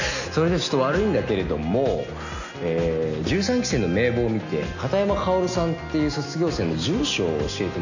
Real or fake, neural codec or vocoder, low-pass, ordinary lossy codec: real; none; 7.2 kHz; none